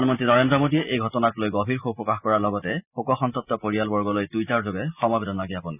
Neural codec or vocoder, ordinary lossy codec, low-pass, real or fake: none; none; 3.6 kHz; real